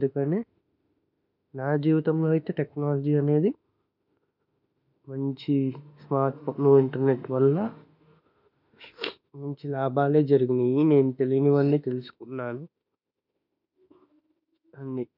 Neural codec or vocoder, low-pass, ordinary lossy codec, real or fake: autoencoder, 48 kHz, 32 numbers a frame, DAC-VAE, trained on Japanese speech; 5.4 kHz; none; fake